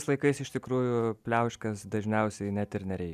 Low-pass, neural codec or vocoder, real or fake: 14.4 kHz; none; real